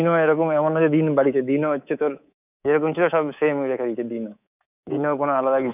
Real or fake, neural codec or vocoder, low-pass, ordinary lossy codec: fake; codec, 24 kHz, 3.1 kbps, DualCodec; 3.6 kHz; none